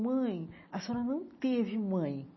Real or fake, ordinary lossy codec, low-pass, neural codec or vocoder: real; MP3, 24 kbps; 7.2 kHz; none